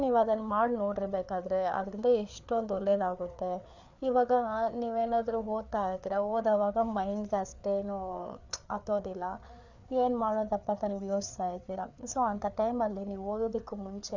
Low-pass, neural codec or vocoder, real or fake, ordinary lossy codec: 7.2 kHz; codec, 16 kHz, 4 kbps, FreqCodec, larger model; fake; none